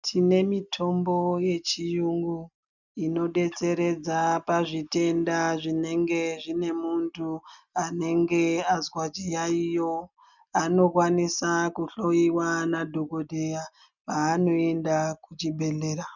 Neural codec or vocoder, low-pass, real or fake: none; 7.2 kHz; real